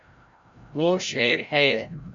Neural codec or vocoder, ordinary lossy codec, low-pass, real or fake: codec, 16 kHz, 0.5 kbps, FreqCodec, larger model; MP3, 64 kbps; 7.2 kHz; fake